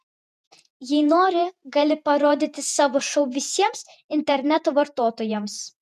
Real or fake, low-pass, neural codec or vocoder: fake; 14.4 kHz; vocoder, 48 kHz, 128 mel bands, Vocos